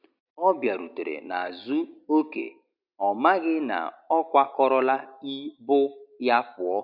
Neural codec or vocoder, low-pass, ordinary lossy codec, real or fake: none; 5.4 kHz; none; real